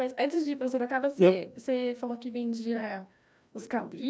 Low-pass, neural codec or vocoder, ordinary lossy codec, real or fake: none; codec, 16 kHz, 1 kbps, FreqCodec, larger model; none; fake